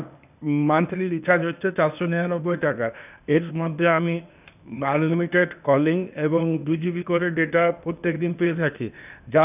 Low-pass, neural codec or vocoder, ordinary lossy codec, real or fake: 3.6 kHz; codec, 16 kHz, 0.8 kbps, ZipCodec; none; fake